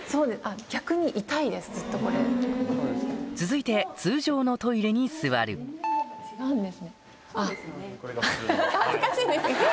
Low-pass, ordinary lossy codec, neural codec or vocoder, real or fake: none; none; none; real